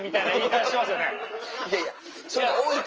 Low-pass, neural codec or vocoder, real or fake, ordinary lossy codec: 7.2 kHz; none; real; Opus, 24 kbps